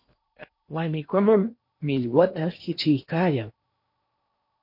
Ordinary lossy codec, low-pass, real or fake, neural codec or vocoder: MP3, 32 kbps; 5.4 kHz; fake; codec, 16 kHz in and 24 kHz out, 0.8 kbps, FocalCodec, streaming, 65536 codes